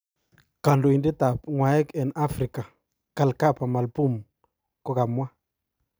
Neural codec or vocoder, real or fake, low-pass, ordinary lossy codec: none; real; none; none